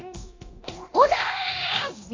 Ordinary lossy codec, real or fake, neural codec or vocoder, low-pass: AAC, 32 kbps; fake; codec, 24 kHz, 0.9 kbps, DualCodec; 7.2 kHz